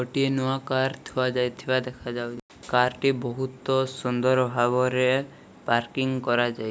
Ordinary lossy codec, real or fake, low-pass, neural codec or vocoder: none; real; none; none